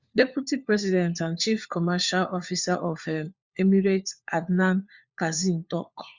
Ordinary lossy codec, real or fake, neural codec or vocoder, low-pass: Opus, 64 kbps; fake; codec, 16 kHz, 4 kbps, FunCodec, trained on LibriTTS, 50 frames a second; 7.2 kHz